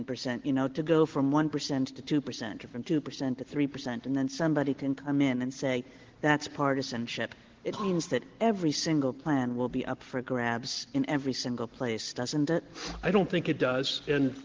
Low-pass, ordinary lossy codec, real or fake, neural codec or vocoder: 7.2 kHz; Opus, 16 kbps; real; none